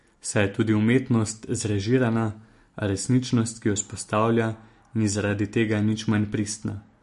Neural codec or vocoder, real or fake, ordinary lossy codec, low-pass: none; real; MP3, 48 kbps; 14.4 kHz